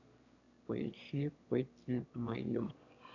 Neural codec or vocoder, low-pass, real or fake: autoencoder, 22.05 kHz, a latent of 192 numbers a frame, VITS, trained on one speaker; 7.2 kHz; fake